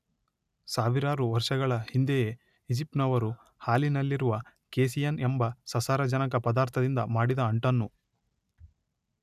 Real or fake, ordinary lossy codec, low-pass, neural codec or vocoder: real; none; 14.4 kHz; none